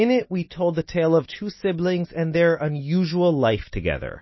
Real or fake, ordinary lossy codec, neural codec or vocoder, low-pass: real; MP3, 24 kbps; none; 7.2 kHz